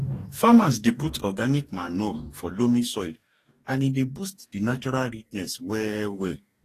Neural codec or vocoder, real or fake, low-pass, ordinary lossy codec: codec, 44.1 kHz, 2.6 kbps, DAC; fake; 14.4 kHz; AAC, 48 kbps